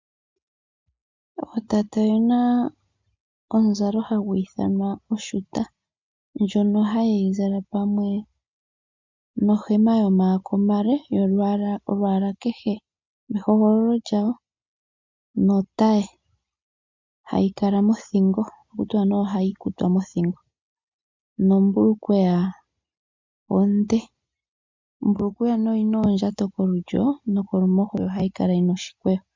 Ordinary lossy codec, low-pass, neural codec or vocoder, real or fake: MP3, 64 kbps; 7.2 kHz; none; real